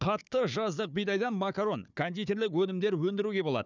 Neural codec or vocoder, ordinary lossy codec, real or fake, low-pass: codec, 16 kHz, 4 kbps, FunCodec, trained on Chinese and English, 50 frames a second; none; fake; 7.2 kHz